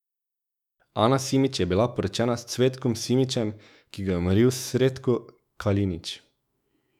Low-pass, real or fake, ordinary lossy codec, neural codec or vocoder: 19.8 kHz; fake; none; autoencoder, 48 kHz, 128 numbers a frame, DAC-VAE, trained on Japanese speech